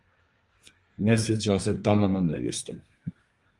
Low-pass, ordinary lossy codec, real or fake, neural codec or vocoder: 10.8 kHz; Opus, 32 kbps; fake; codec, 24 kHz, 1 kbps, SNAC